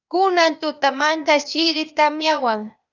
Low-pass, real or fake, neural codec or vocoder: 7.2 kHz; fake; codec, 16 kHz, 0.8 kbps, ZipCodec